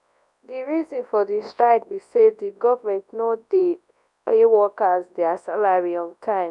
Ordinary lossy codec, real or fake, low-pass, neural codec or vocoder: none; fake; 10.8 kHz; codec, 24 kHz, 0.9 kbps, WavTokenizer, large speech release